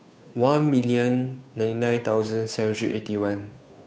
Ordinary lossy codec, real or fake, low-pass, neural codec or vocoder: none; fake; none; codec, 16 kHz, 2 kbps, FunCodec, trained on Chinese and English, 25 frames a second